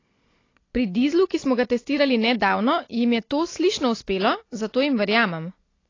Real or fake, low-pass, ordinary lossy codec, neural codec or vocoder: real; 7.2 kHz; AAC, 32 kbps; none